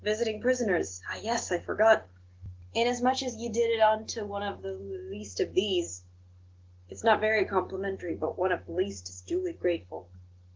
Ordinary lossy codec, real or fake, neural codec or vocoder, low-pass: Opus, 16 kbps; real; none; 7.2 kHz